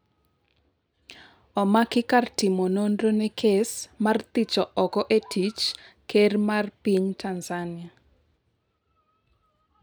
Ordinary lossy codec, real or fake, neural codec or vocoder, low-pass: none; real; none; none